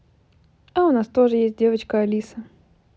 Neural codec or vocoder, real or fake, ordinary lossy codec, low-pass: none; real; none; none